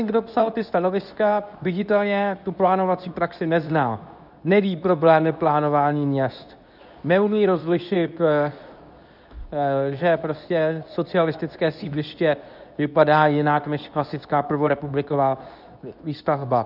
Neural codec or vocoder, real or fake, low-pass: codec, 24 kHz, 0.9 kbps, WavTokenizer, medium speech release version 2; fake; 5.4 kHz